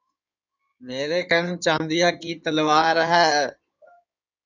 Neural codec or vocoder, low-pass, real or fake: codec, 16 kHz in and 24 kHz out, 2.2 kbps, FireRedTTS-2 codec; 7.2 kHz; fake